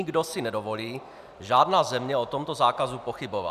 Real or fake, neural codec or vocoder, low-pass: real; none; 14.4 kHz